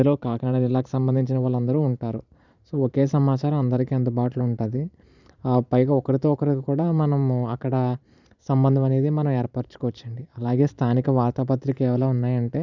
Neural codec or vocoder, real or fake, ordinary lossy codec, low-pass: vocoder, 44.1 kHz, 128 mel bands every 512 samples, BigVGAN v2; fake; none; 7.2 kHz